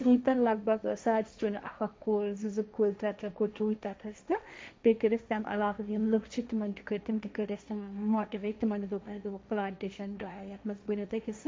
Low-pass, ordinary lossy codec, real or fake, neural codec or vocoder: none; none; fake; codec, 16 kHz, 1.1 kbps, Voila-Tokenizer